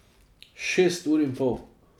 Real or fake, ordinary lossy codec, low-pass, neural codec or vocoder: real; none; 19.8 kHz; none